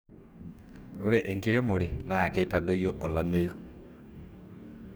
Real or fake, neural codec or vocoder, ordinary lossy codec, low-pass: fake; codec, 44.1 kHz, 2.6 kbps, DAC; none; none